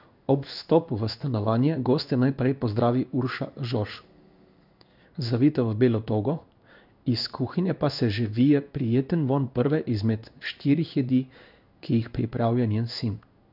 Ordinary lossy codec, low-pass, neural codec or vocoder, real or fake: none; 5.4 kHz; codec, 16 kHz in and 24 kHz out, 1 kbps, XY-Tokenizer; fake